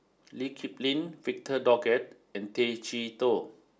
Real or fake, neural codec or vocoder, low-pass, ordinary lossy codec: real; none; none; none